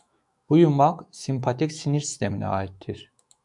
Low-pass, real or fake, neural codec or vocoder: 10.8 kHz; fake; autoencoder, 48 kHz, 128 numbers a frame, DAC-VAE, trained on Japanese speech